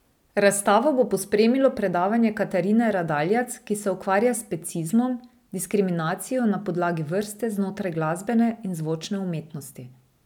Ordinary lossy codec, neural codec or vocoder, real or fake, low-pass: none; none; real; 19.8 kHz